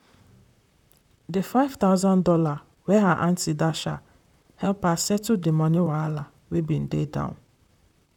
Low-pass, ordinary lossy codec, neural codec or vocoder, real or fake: 19.8 kHz; none; vocoder, 44.1 kHz, 128 mel bands, Pupu-Vocoder; fake